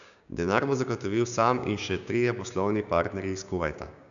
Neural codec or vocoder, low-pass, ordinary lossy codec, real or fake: codec, 16 kHz, 6 kbps, DAC; 7.2 kHz; none; fake